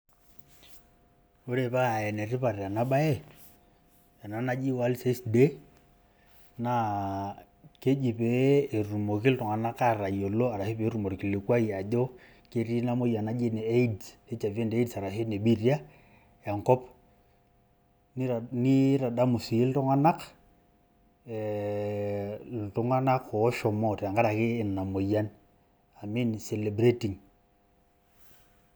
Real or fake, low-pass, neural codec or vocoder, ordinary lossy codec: real; none; none; none